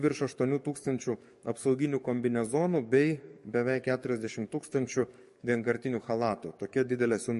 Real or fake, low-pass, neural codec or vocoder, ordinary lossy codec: fake; 14.4 kHz; codec, 44.1 kHz, 7.8 kbps, DAC; MP3, 48 kbps